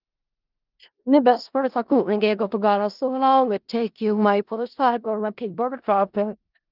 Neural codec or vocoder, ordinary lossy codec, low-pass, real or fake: codec, 16 kHz in and 24 kHz out, 0.4 kbps, LongCat-Audio-Codec, four codebook decoder; Opus, 24 kbps; 5.4 kHz; fake